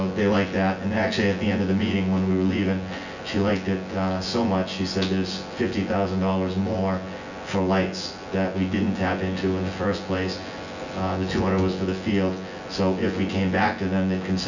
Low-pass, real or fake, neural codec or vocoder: 7.2 kHz; fake; vocoder, 24 kHz, 100 mel bands, Vocos